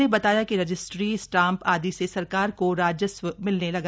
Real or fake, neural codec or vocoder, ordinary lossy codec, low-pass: real; none; none; none